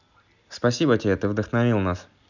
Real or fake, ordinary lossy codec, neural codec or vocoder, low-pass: real; none; none; 7.2 kHz